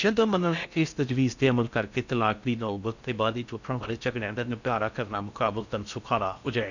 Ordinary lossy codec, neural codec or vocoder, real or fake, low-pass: none; codec, 16 kHz in and 24 kHz out, 0.6 kbps, FocalCodec, streaming, 4096 codes; fake; 7.2 kHz